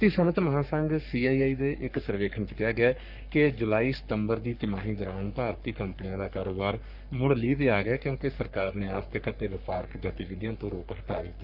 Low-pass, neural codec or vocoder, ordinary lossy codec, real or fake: 5.4 kHz; codec, 44.1 kHz, 3.4 kbps, Pupu-Codec; none; fake